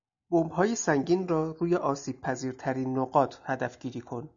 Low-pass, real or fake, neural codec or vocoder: 7.2 kHz; real; none